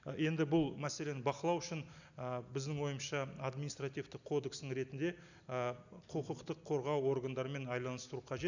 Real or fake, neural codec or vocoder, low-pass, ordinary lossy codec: real; none; 7.2 kHz; none